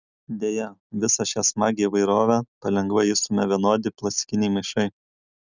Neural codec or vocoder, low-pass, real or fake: none; 7.2 kHz; real